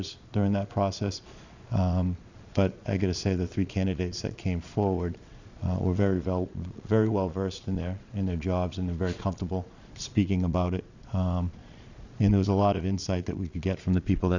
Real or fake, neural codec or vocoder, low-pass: fake; vocoder, 22.05 kHz, 80 mel bands, Vocos; 7.2 kHz